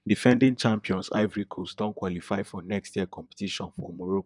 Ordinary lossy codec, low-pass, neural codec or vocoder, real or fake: none; 10.8 kHz; vocoder, 44.1 kHz, 128 mel bands, Pupu-Vocoder; fake